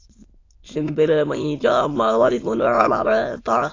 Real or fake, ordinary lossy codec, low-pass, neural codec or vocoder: fake; AAC, 32 kbps; 7.2 kHz; autoencoder, 22.05 kHz, a latent of 192 numbers a frame, VITS, trained on many speakers